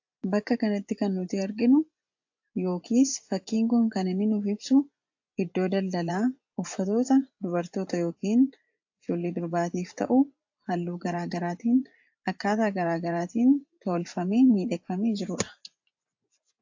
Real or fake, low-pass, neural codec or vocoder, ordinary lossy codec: fake; 7.2 kHz; vocoder, 24 kHz, 100 mel bands, Vocos; AAC, 48 kbps